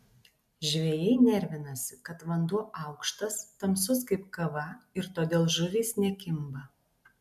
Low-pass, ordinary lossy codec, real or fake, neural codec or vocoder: 14.4 kHz; MP3, 96 kbps; real; none